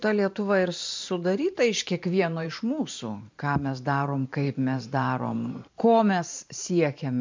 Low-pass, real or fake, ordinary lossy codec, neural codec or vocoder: 7.2 kHz; fake; MP3, 64 kbps; vocoder, 44.1 kHz, 128 mel bands every 512 samples, BigVGAN v2